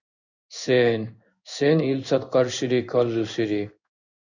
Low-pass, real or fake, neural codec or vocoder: 7.2 kHz; fake; codec, 16 kHz in and 24 kHz out, 1 kbps, XY-Tokenizer